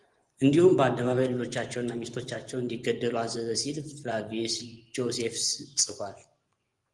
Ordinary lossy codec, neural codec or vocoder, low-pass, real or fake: Opus, 24 kbps; vocoder, 24 kHz, 100 mel bands, Vocos; 10.8 kHz; fake